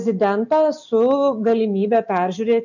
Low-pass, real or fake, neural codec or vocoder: 7.2 kHz; real; none